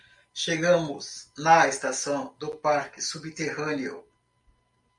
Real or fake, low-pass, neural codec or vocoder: real; 10.8 kHz; none